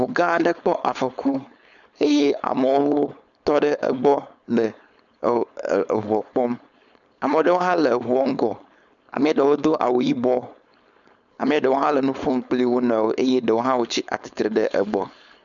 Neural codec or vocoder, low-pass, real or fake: codec, 16 kHz, 4.8 kbps, FACodec; 7.2 kHz; fake